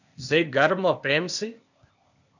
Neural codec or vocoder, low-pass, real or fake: codec, 16 kHz, 0.8 kbps, ZipCodec; 7.2 kHz; fake